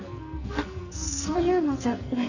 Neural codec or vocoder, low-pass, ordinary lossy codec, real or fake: codec, 44.1 kHz, 2.6 kbps, SNAC; 7.2 kHz; none; fake